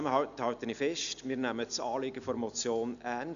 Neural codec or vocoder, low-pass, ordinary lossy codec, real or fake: none; 7.2 kHz; none; real